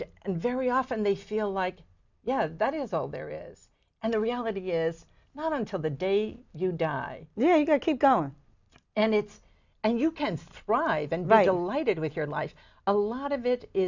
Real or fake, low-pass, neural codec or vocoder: real; 7.2 kHz; none